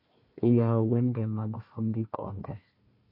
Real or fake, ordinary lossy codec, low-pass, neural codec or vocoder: fake; MP3, 32 kbps; 5.4 kHz; codec, 16 kHz, 1 kbps, FunCodec, trained on Chinese and English, 50 frames a second